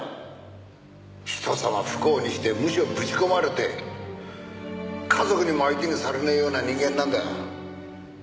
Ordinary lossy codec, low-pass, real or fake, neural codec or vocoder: none; none; real; none